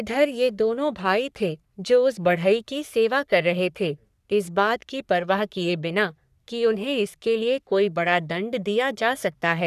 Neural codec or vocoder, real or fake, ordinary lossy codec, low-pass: codec, 44.1 kHz, 3.4 kbps, Pupu-Codec; fake; none; 14.4 kHz